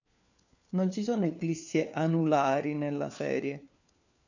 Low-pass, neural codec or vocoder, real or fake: 7.2 kHz; codec, 16 kHz, 4 kbps, FunCodec, trained on LibriTTS, 50 frames a second; fake